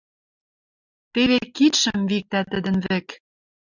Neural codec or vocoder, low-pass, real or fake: none; 7.2 kHz; real